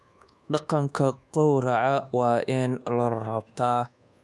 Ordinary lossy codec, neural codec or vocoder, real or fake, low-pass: none; codec, 24 kHz, 1.2 kbps, DualCodec; fake; none